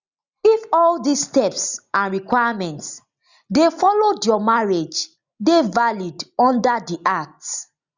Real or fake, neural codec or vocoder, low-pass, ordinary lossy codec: real; none; 7.2 kHz; Opus, 64 kbps